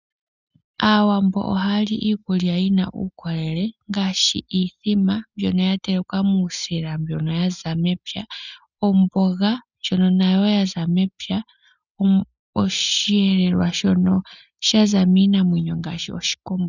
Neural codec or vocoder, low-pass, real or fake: none; 7.2 kHz; real